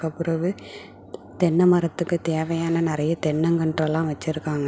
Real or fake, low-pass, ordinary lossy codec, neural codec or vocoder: real; none; none; none